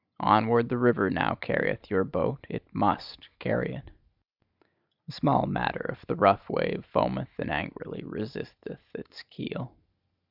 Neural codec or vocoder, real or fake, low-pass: none; real; 5.4 kHz